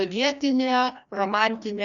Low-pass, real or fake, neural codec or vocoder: 7.2 kHz; fake; codec, 16 kHz, 1 kbps, FreqCodec, larger model